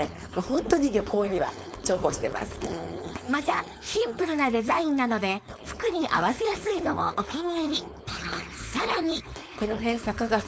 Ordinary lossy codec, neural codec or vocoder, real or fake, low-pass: none; codec, 16 kHz, 4.8 kbps, FACodec; fake; none